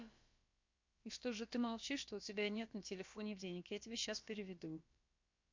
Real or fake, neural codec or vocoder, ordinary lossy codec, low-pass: fake; codec, 16 kHz, about 1 kbps, DyCAST, with the encoder's durations; MP3, 48 kbps; 7.2 kHz